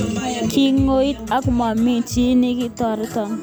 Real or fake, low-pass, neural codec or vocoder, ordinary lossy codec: real; none; none; none